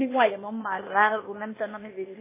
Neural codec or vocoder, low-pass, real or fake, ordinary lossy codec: codec, 16 kHz, 0.8 kbps, ZipCodec; 3.6 kHz; fake; MP3, 16 kbps